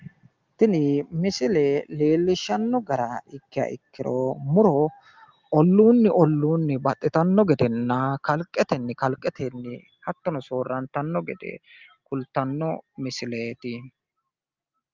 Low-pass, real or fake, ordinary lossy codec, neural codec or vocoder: 7.2 kHz; real; Opus, 32 kbps; none